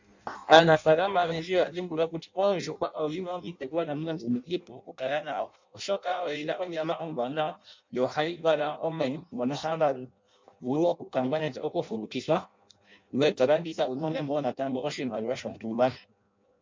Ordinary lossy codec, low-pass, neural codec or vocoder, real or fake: MP3, 64 kbps; 7.2 kHz; codec, 16 kHz in and 24 kHz out, 0.6 kbps, FireRedTTS-2 codec; fake